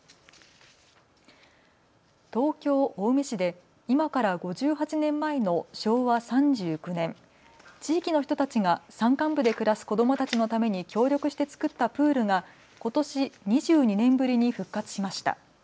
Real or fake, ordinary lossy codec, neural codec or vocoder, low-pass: real; none; none; none